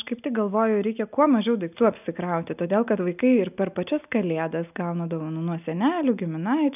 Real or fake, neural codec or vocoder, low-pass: real; none; 3.6 kHz